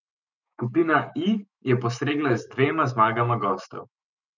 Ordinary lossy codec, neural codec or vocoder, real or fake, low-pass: none; autoencoder, 48 kHz, 128 numbers a frame, DAC-VAE, trained on Japanese speech; fake; 7.2 kHz